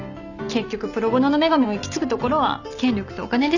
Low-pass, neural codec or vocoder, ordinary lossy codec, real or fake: 7.2 kHz; none; none; real